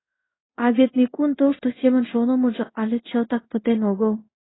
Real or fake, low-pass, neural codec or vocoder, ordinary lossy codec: fake; 7.2 kHz; codec, 16 kHz in and 24 kHz out, 1 kbps, XY-Tokenizer; AAC, 16 kbps